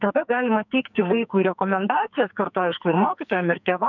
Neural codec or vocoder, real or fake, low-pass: codec, 44.1 kHz, 2.6 kbps, SNAC; fake; 7.2 kHz